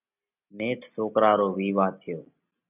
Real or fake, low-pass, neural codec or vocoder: real; 3.6 kHz; none